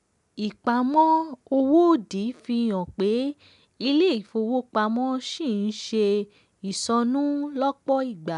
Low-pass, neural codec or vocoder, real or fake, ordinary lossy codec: 10.8 kHz; none; real; none